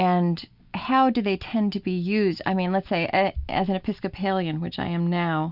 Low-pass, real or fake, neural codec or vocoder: 5.4 kHz; real; none